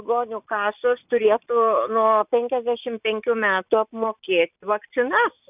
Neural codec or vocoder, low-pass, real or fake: none; 3.6 kHz; real